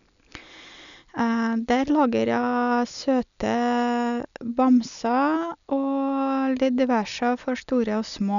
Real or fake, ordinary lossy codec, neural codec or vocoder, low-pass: real; none; none; 7.2 kHz